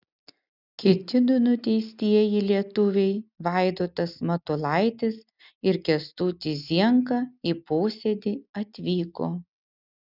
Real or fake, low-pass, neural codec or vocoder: real; 5.4 kHz; none